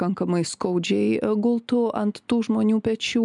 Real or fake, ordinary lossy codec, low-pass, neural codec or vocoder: real; MP3, 96 kbps; 10.8 kHz; none